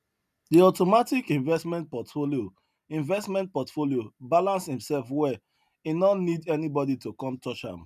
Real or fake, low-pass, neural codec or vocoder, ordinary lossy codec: real; 14.4 kHz; none; none